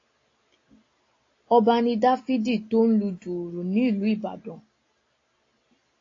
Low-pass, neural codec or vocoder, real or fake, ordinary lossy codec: 7.2 kHz; none; real; AAC, 32 kbps